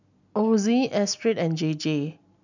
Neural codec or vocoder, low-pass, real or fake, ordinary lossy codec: none; 7.2 kHz; real; none